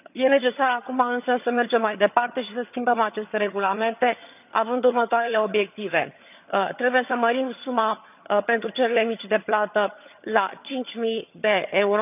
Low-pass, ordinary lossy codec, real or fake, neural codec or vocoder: 3.6 kHz; none; fake; vocoder, 22.05 kHz, 80 mel bands, HiFi-GAN